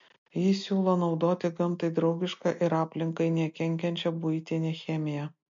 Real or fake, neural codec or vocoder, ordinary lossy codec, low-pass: real; none; MP3, 48 kbps; 7.2 kHz